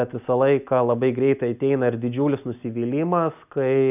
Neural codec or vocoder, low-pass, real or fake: none; 3.6 kHz; real